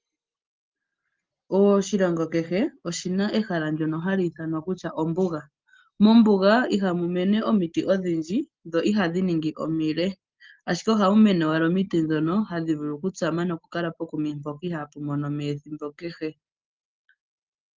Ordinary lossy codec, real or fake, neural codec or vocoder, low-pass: Opus, 32 kbps; real; none; 7.2 kHz